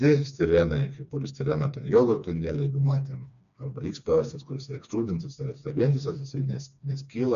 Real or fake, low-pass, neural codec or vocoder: fake; 7.2 kHz; codec, 16 kHz, 2 kbps, FreqCodec, smaller model